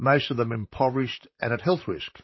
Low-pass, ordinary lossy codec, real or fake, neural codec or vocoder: 7.2 kHz; MP3, 24 kbps; fake; vocoder, 44.1 kHz, 128 mel bands, Pupu-Vocoder